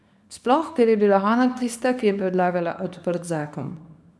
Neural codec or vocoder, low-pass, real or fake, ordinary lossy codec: codec, 24 kHz, 0.9 kbps, WavTokenizer, small release; none; fake; none